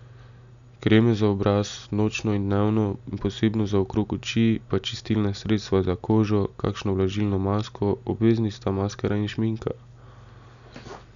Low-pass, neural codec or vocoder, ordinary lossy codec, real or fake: 7.2 kHz; none; none; real